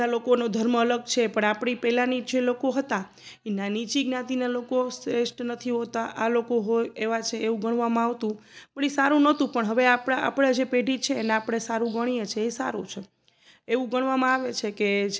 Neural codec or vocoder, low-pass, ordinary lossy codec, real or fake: none; none; none; real